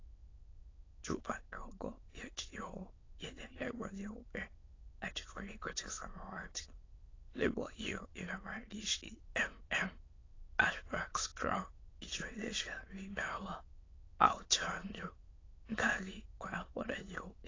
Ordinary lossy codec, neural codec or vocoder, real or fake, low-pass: AAC, 32 kbps; autoencoder, 22.05 kHz, a latent of 192 numbers a frame, VITS, trained on many speakers; fake; 7.2 kHz